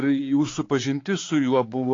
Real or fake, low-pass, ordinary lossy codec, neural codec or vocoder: fake; 7.2 kHz; AAC, 32 kbps; codec, 16 kHz, 4 kbps, X-Codec, HuBERT features, trained on balanced general audio